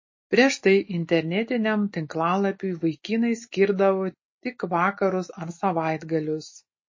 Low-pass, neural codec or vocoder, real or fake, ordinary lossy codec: 7.2 kHz; none; real; MP3, 32 kbps